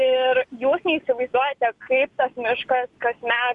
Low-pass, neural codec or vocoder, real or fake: 10.8 kHz; none; real